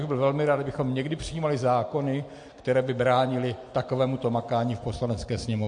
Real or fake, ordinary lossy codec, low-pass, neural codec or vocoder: real; MP3, 48 kbps; 9.9 kHz; none